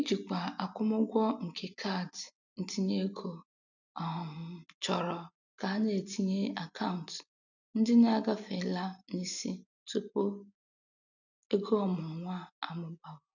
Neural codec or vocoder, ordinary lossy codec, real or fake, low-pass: none; none; real; 7.2 kHz